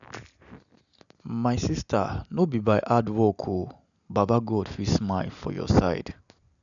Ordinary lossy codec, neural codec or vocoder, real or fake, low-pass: none; none; real; 7.2 kHz